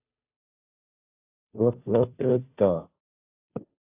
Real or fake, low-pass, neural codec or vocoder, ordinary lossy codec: fake; 3.6 kHz; codec, 16 kHz, 0.5 kbps, FunCodec, trained on Chinese and English, 25 frames a second; AAC, 24 kbps